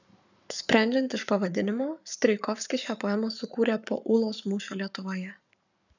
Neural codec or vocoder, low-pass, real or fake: codec, 44.1 kHz, 7.8 kbps, Pupu-Codec; 7.2 kHz; fake